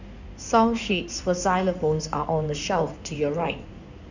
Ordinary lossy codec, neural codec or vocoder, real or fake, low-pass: none; codec, 16 kHz in and 24 kHz out, 2.2 kbps, FireRedTTS-2 codec; fake; 7.2 kHz